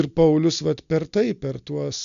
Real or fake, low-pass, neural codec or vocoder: real; 7.2 kHz; none